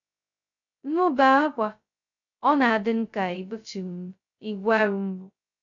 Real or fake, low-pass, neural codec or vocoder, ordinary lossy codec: fake; 7.2 kHz; codec, 16 kHz, 0.2 kbps, FocalCodec; none